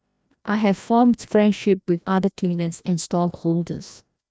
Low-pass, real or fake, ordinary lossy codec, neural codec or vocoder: none; fake; none; codec, 16 kHz, 1 kbps, FreqCodec, larger model